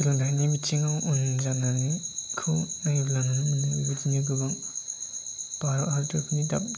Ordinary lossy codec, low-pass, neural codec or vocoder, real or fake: none; none; none; real